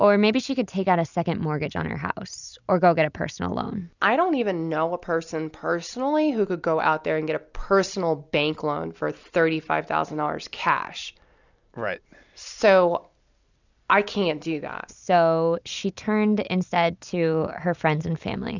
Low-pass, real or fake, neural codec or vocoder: 7.2 kHz; real; none